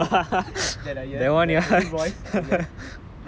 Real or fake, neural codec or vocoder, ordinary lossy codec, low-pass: real; none; none; none